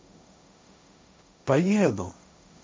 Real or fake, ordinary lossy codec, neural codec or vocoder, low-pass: fake; none; codec, 16 kHz, 1.1 kbps, Voila-Tokenizer; none